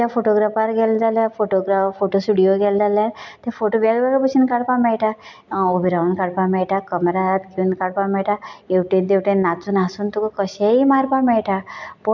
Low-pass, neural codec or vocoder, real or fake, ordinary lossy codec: 7.2 kHz; none; real; none